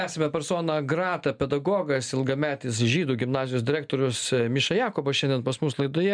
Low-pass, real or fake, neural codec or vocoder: 9.9 kHz; real; none